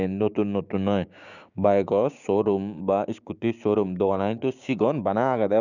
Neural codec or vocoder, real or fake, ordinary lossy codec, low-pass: codec, 16 kHz, 6 kbps, DAC; fake; none; 7.2 kHz